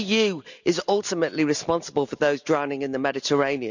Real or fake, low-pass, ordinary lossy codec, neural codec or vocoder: real; 7.2 kHz; none; none